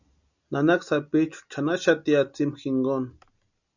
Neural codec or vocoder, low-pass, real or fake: none; 7.2 kHz; real